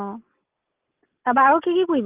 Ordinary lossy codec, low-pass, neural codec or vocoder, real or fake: Opus, 24 kbps; 3.6 kHz; none; real